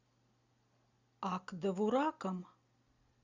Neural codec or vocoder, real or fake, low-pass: none; real; 7.2 kHz